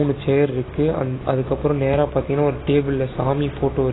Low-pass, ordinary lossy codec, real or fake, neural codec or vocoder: 7.2 kHz; AAC, 16 kbps; real; none